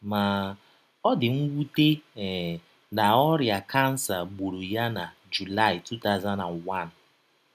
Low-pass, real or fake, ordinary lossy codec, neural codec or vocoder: 14.4 kHz; real; none; none